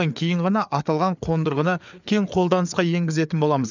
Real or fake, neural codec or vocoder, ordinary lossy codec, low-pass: fake; codec, 16 kHz, 4 kbps, FreqCodec, larger model; none; 7.2 kHz